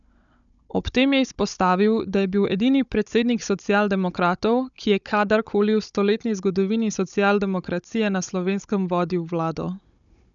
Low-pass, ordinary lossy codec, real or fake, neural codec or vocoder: 7.2 kHz; none; fake; codec, 16 kHz, 16 kbps, FunCodec, trained on Chinese and English, 50 frames a second